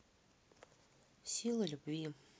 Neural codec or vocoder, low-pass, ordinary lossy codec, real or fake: none; none; none; real